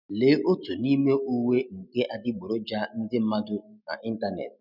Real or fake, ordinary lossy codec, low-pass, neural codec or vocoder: real; none; 5.4 kHz; none